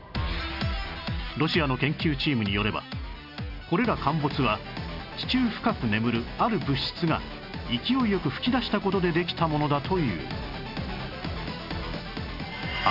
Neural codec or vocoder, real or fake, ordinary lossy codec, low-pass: none; real; none; 5.4 kHz